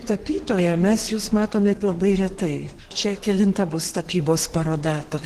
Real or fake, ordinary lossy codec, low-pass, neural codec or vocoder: fake; Opus, 16 kbps; 14.4 kHz; codec, 32 kHz, 1.9 kbps, SNAC